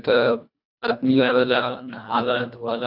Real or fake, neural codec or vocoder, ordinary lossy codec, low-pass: fake; codec, 24 kHz, 1.5 kbps, HILCodec; none; 5.4 kHz